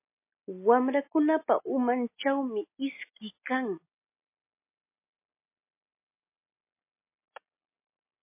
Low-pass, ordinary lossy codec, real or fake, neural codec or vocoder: 3.6 kHz; MP3, 24 kbps; real; none